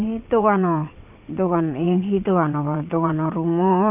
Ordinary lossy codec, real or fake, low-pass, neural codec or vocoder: none; fake; 3.6 kHz; vocoder, 22.05 kHz, 80 mel bands, WaveNeXt